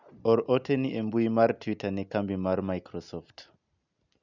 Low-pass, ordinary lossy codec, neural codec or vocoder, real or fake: 7.2 kHz; none; none; real